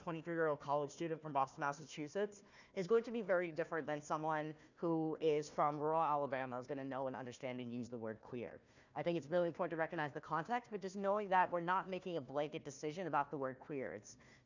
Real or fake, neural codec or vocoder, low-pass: fake; codec, 16 kHz, 1 kbps, FunCodec, trained on Chinese and English, 50 frames a second; 7.2 kHz